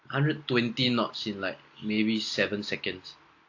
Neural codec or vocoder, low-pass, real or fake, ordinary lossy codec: none; 7.2 kHz; real; AAC, 48 kbps